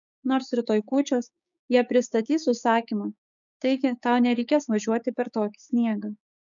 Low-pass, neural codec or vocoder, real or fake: 7.2 kHz; codec, 16 kHz, 6 kbps, DAC; fake